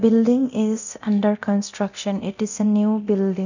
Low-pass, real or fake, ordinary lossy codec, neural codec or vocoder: 7.2 kHz; fake; none; codec, 24 kHz, 0.9 kbps, DualCodec